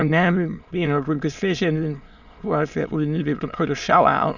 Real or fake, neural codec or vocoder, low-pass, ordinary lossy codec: fake; autoencoder, 22.05 kHz, a latent of 192 numbers a frame, VITS, trained on many speakers; 7.2 kHz; Opus, 64 kbps